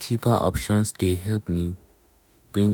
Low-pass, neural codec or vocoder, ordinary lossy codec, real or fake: none; autoencoder, 48 kHz, 32 numbers a frame, DAC-VAE, trained on Japanese speech; none; fake